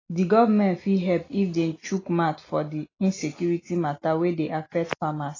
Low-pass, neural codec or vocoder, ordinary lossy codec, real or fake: 7.2 kHz; none; AAC, 32 kbps; real